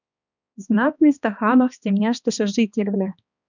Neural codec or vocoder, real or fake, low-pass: codec, 16 kHz, 2 kbps, X-Codec, HuBERT features, trained on balanced general audio; fake; 7.2 kHz